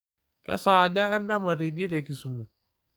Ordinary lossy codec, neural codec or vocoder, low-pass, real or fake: none; codec, 44.1 kHz, 2.6 kbps, SNAC; none; fake